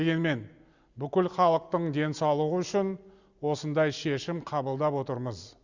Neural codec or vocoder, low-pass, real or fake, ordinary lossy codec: none; 7.2 kHz; real; Opus, 64 kbps